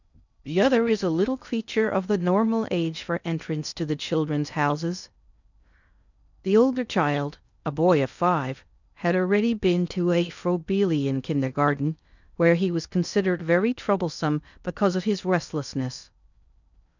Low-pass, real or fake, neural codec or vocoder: 7.2 kHz; fake; codec, 16 kHz in and 24 kHz out, 0.6 kbps, FocalCodec, streaming, 2048 codes